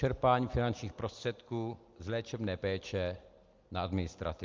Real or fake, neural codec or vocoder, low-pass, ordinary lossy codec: real; none; 7.2 kHz; Opus, 32 kbps